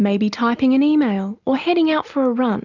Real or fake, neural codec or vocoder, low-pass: real; none; 7.2 kHz